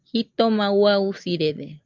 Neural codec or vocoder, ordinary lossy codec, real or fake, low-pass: none; Opus, 24 kbps; real; 7.2 kHz